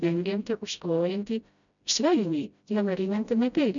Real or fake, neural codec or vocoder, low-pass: fake; codec, 16 kHz, 0.5 kbps, FreqCodec, smaller model; 7.2 kHz